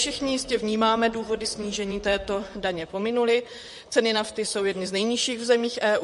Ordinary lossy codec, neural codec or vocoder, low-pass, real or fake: MP3, 48 kbps; vocoder, 44.1 kHz, 128 mel bands, Pupu-Vocoder; 14.4 kHz; fake